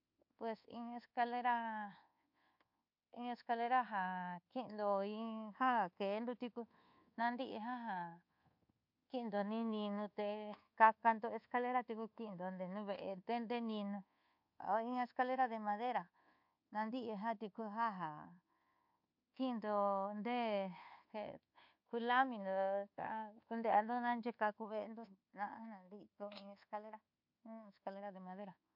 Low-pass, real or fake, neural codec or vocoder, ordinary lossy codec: 5.4 kHz; fake; codec, 24 kHz, 1.2 kbps, DualCodec; MP3, 48 kbps